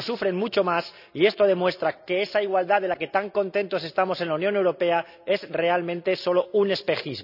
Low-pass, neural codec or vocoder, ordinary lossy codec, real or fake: 5.4 kHz; none; none; real